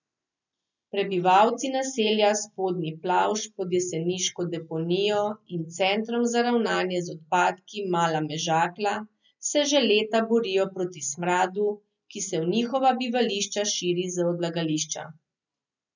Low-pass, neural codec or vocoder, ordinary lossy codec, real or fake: 7.2 kHz; none; none; real